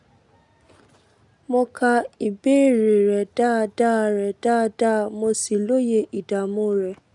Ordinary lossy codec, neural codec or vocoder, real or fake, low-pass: none; none; real; 10.8 kHz